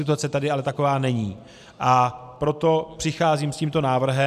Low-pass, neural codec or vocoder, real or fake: 14.4 kHz; none; real